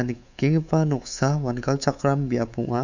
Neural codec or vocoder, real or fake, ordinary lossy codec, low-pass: codec, 24 kHz, 3.1 kbps, DualCodec; fake; none; 7.2 kHz